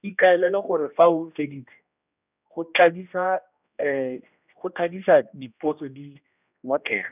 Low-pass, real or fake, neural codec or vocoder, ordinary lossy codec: 3.6 kHz; fake; codec, 16 kHz, 1 kbps, X-Codec, HuBERT features, trained on general audio; none